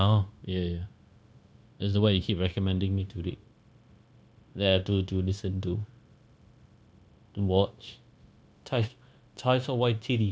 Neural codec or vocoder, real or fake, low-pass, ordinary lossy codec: codec, 16 kHz, 0.9 kbps, LongCat-Audio-Codec; fake; none; none